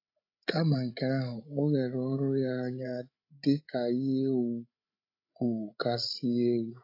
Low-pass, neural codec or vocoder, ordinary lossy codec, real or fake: 5.4 kHz; codec, 16 kHz, 16 kbps, FreqCodec, larger model; AAC, 32 kbps; fake